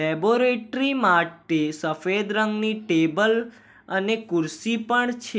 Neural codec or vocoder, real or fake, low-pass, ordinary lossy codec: none; real; none; none